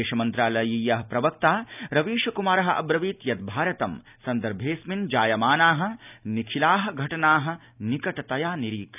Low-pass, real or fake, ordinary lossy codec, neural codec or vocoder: 3.6 kHz; real; none; none